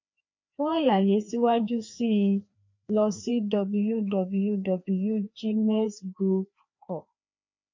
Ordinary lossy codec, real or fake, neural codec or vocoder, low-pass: MP3, 48 kbps; fake; codec, 16 kHz, 2 kbps, FreqCodec, larger model; 7.2 kHz